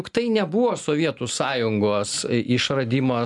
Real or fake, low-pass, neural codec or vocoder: real; 10.8 kHz; none